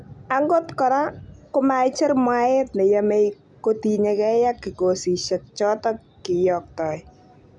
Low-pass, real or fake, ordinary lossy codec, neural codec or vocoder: 10.8 kHz; real; none; none